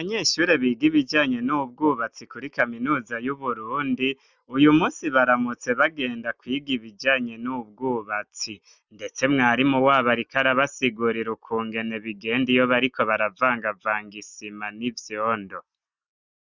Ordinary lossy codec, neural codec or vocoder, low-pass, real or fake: Opus, 64 kbps; none; 7.2 kHz; real